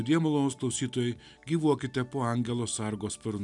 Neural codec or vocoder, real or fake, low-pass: none; real; 10.8 kHz